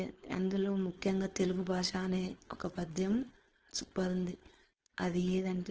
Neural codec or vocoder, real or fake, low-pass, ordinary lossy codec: codec, 16 kHz, 4.8 kbps, FACodec; fake; 7.2 kHz; Opus, 16 kbps